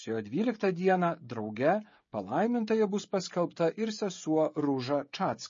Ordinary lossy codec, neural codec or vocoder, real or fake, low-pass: MP3, 32 kbps; codec, 16 kHz, 16 kbps, FreqCodec, smaller model; fake; 7.2 kHz